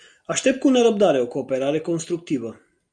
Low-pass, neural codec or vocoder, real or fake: 9.9 kHz; none; real